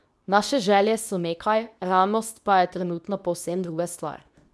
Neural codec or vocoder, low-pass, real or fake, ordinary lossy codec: codec, 24 kHz, 0.9 kbps, WavTokenizer, medium speech release version 2; none; fake; none